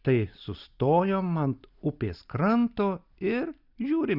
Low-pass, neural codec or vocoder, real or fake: 5.4 kHz; none; real